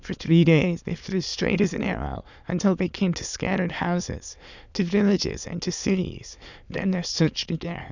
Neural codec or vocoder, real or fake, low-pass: autoencoder, 22.05 kHz, a latent of 192 numbers a frame, VITS, trained on many speakers; fake; 7.2 kHz